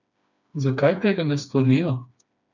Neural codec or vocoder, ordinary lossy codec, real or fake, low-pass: codec, 16 kHz, 2 kbps, FreqCodec, smaller model; none; fake; 7.2 kHz